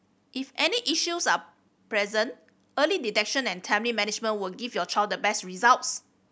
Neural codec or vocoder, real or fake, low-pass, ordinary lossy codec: none; real; none; none